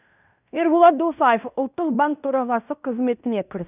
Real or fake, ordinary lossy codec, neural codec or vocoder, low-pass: fake; none; codec, 16 kHz in and 24 kHz out, 0.9 kbps, LongCat-Audio-Codec, fine tuned four codebook decoder; 3.6 kHz